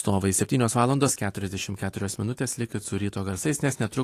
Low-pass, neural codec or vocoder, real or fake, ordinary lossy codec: 14.4 kHz; autoencoder, 48 kHz, 128 numbers a frame, DAC-VAE, trained on Japanese speech; fake; AAC, 48 kbps